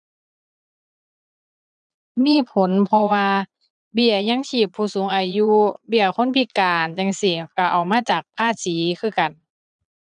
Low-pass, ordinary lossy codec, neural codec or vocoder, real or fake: 9.9 kHz; none; vocoder, 22.05 kHz, 80 mel bands, Vocos; fake